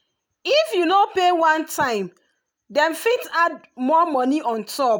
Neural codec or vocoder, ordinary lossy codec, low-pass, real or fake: none; none; none; real